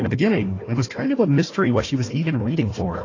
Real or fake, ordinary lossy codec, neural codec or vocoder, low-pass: fake; AAC, 32 kbps; codec, 16 kHz, 1 kbps, FunCodec, trained on Chinese and English, 50 frames a second; 7.2 kHz